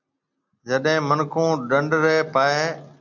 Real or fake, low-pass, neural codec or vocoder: real; 7.2 kHz; none